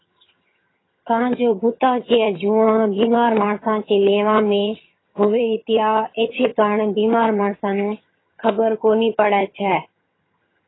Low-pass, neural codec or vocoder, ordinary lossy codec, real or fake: 7.2 kHz; vocoder, 22.05 kHz, 80 mel bands, HiFi-GAN; AAC, 16 kbps; fake